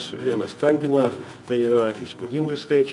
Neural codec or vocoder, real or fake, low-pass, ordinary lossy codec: codec, 24 kHz, 0.9 kbps, WavTokenizer, medium music audio release; fake; 10.8 kHz; MP3, 64 kbps